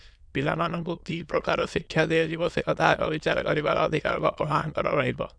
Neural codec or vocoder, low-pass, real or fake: autoencoder, 22.05 kHz, a latent of 192 numbers a frame, VITS, trained on many speakers; 9.9 kHz; fake